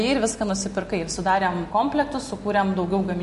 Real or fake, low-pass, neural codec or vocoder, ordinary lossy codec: real; 14.4 kHz; none; MP3, 48 kbps